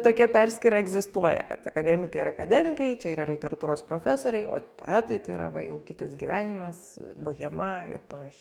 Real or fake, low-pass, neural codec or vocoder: fake; 19.8 kHz; codec, 44.1 kHz, 2.6 kbps, DAC